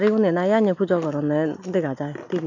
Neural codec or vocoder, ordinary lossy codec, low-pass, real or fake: none; none; 7.2 kHz; real